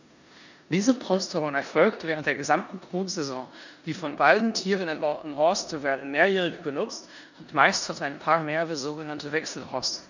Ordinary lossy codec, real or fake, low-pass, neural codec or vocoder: none; fake; 7.2 kHz; codec, 16 kHz in and 24 kHz out, 0.9 kbps, LongCat-Audio-Codec, four codebook decoder